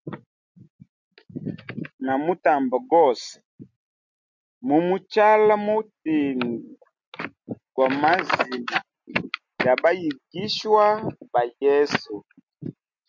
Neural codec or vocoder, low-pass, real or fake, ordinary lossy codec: none; 7.2 kHz; real; MP3, 64 kbps